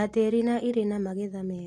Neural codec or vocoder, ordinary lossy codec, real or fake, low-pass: none; AAC, 48 kbps; real; 14.4 kHz